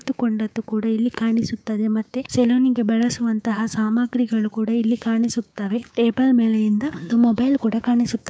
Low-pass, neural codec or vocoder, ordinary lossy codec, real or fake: none; codec, 16 kHz, 6 kbps, DAC; none; fake